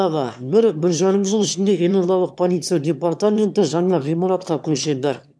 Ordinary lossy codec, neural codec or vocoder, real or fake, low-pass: none; autoencoder, 22.05 kHz, a latent of 192 numbers a frame, VITS, trained on one speaker; fake; none